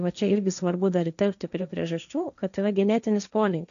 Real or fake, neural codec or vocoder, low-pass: fake; codec, 16 kHz, 1.1 kbps, Voila-Tokenizer; 7.2 kHz